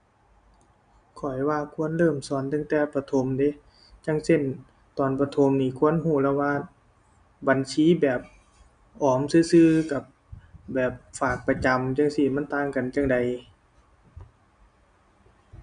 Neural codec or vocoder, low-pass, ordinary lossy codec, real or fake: none; 9.9 kHz; none; real